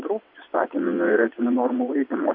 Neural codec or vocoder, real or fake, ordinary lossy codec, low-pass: vocoder, 22.05 kHz, 80 mel bands, WaveNeXt; fake; MP3, 32 kbps; 5.4 kHz